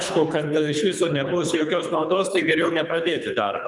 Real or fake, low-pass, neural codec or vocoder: fake; 10.8 kHz; codec, 24 kHz, 3 kbps, HILCodec